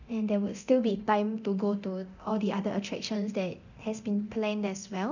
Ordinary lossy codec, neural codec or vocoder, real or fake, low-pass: none; codec, 24 kHz, 0.9 kbps, DualCodec; fake; 7.2 kHz